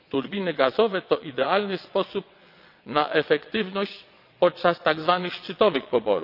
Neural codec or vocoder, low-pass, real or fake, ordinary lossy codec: vocoder, 22.05 kHz, 80 mel bands, WaveNeXt; 5.4 kHz; fake; AAC, 48 kbps